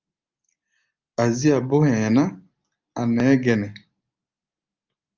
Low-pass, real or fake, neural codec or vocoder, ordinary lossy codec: 7.2 kHz; real; none; Opus, 24 kbps